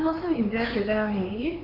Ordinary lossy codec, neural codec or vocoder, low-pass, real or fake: none; codec, 16 kHz, 4 kbps, X-Codec, HuBERT features, trained on LibriSpeech; 5.4 kHz; fake